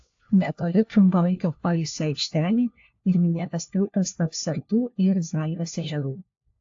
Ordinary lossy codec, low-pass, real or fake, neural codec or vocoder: AAC, 48 kbps; 7.2 kHz; fake; codec, 16 kHz, 1 kbps, FunCodec, trained on LibriTTS, 50 frames a second